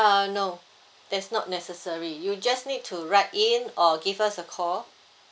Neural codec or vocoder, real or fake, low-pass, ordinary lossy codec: none; real; none; none